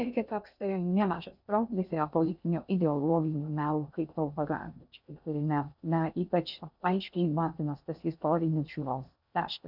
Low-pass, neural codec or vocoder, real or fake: 5.4 kHz; codec, 16 kHz in and 24 kHz out, 0.6 kbps, FocalCodec, streaming, 2048 codes; fake